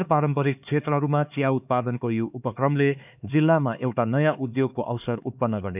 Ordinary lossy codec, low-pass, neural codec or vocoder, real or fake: none; 3.6 kHz; codec, 16 kHz, 4 kbps, X-Codec, HuBERT features, trained on LibriSpeech; fake